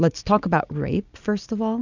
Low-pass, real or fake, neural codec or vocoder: 7.2 kHz; real; none